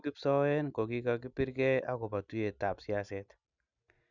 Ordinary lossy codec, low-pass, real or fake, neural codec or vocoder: none; 7.2 kHz; real; none